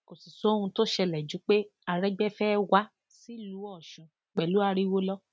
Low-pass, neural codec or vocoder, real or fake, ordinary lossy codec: none; none; real; none